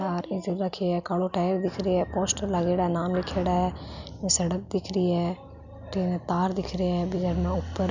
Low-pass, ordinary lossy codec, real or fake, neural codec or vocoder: 7.2 kHz; none; real; none